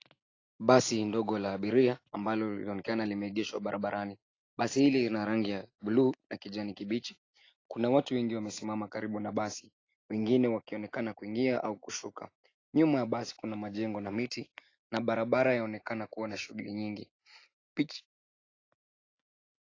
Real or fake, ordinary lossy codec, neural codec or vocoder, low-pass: real; AAC, 32 kbps; none; 7.2 kHz